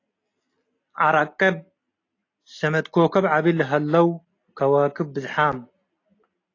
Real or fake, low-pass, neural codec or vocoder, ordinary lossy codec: real; 7.2 kHz; none; AAC, 32 kbps